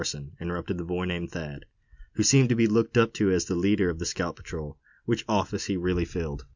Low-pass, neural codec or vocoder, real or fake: 7.2 kHz; none; real